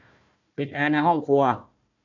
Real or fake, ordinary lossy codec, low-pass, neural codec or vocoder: fake; none; 7.2 kHz; codec, 16 kHz, 1 kbps, FunCodec, trained on Chinese and English, 50 frames a second